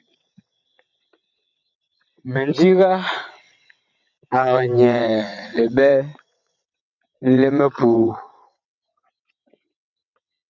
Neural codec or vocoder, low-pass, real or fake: vocoder, 22.05 kHz, 80 mel bands, WaveNeXt; 7.2 kHz; fake